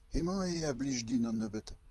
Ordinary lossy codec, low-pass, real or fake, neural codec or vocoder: Opus, 32 kbps; 14.4 kHz; fake; vocoder, 44.1 kHz, 128 mel bands, Pupu-Vocoder